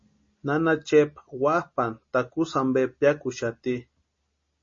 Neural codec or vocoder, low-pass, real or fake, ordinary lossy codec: none; 7.2 kHz; real; MP3, 32 kbps